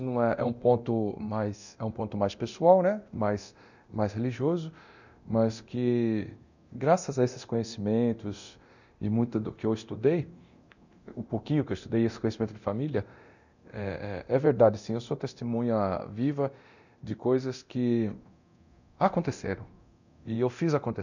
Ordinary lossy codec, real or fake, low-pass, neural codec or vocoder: none; fake; 7.2 kHz; codec, 24 kHz, 0.9 kbps, DualCodec